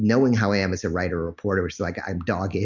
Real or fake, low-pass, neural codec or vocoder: real; 7.2 kHz; none